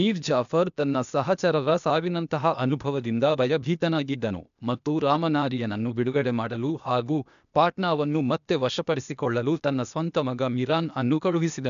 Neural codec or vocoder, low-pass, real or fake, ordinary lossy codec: codec, 16 kHz, 0.8 kbps, ZipCodec; 7.2 kHz; fake; none